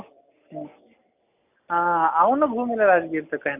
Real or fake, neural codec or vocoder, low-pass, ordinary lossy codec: real; none; 3.6 kHz; none